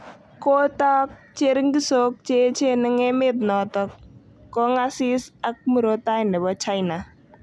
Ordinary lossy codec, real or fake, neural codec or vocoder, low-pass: none; real; none; none